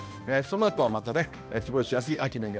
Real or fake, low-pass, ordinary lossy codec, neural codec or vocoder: fake; none; none; codec, 16 kHz, 1 kbps, X-Codec, HuBERT features, trained on balanced general audio